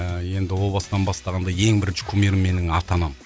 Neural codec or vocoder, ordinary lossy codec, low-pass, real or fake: none; none; none; real